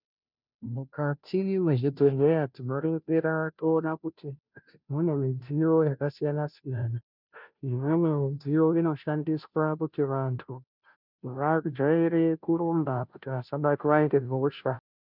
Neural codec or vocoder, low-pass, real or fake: codec, 16 kHz, 0.5 kbps, FunCodec, trained on Chinese and English, 25 frames a second; 5.4 kHz; fake